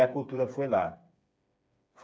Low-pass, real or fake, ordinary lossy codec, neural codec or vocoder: none; fake; none; codec, 16 kHz, 4 kbps, FreqCodec, smaller model